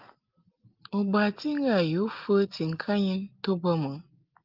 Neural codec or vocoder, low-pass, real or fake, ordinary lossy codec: none; 5.4 kHz; real; Opus, 32 kbps